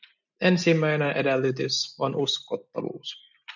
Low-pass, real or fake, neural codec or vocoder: 7.2 kHz; real; none